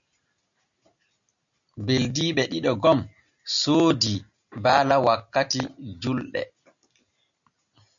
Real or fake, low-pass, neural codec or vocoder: real; 7.2 kHz; none